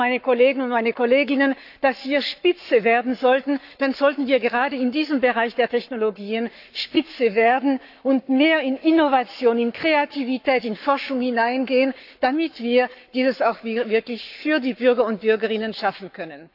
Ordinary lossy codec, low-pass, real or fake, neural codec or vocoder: AAC, 48 kbps; 5.4 kHz; fake; codec, 44.1 kHz, 7.8 kbps, Pupu-Codec